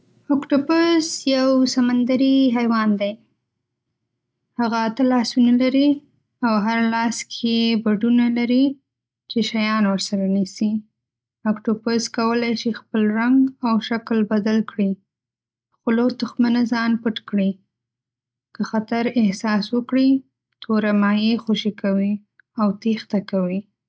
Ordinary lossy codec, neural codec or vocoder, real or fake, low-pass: none; none; real; none